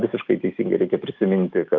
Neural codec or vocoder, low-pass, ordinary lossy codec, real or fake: autoencoder, 48 kHz, 128 numbers a frame, DAC-VAE, trained on Japanese speech; 7.2 kHz; Opus, 24 kbps; fake